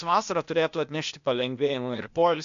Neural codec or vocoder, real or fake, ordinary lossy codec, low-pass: codec, 16 kHz, 0.8 kbps, ZipCodec; fake; MP3, 64 kbps; 7.2 kHz